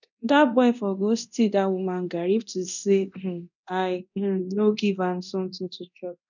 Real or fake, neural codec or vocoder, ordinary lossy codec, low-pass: fake; codec, 24 kHz, 0.9 kbps, DualCodec; none; 7.2 kHz